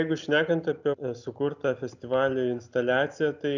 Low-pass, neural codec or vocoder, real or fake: 7.2 kHz; none; real